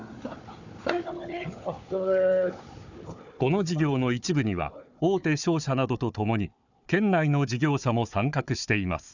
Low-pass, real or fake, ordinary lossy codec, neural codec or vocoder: 7.2 kHz; fake; none; codec, 16 kHz, 4 kbps, FunCodec, trained on Chinese and English, 50 frames a second